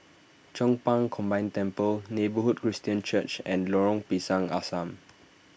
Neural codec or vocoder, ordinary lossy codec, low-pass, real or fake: none; none; none; real